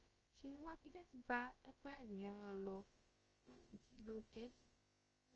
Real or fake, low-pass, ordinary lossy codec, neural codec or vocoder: fake; 7.2 kHz; Opus, 24 kbps; codec, 16 kHz, about 1 kbps, DyCAST, with the encoder's durations